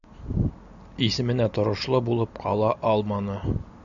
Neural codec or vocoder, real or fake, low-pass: none; real; 7.2 kHz